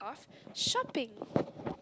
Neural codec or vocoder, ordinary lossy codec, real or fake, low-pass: none; none; real; none